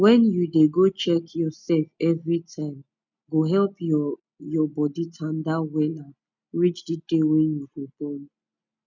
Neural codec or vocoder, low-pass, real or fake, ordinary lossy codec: none; 7.2 kHz; real; none